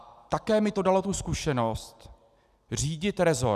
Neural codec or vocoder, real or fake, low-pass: none; real; 14.4 kHz